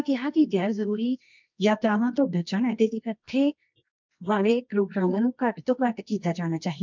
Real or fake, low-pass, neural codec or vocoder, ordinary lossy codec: fake; 7.2 kHz; codec, 24 kHz, 0.9 kbps, WavTokenizer, medium music audio release; MP3, 64 kbps